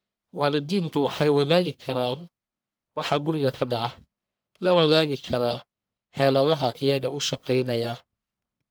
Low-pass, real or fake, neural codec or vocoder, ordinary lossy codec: none; fake; codec, 44.1 kHz, 1.7 kbps, Pupu-Codec; none